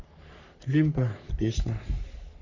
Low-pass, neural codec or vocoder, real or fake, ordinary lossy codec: 7.2 kHz; codec, 44.1 kHz, 3.4 kbps, Pupu-Codec; fake; none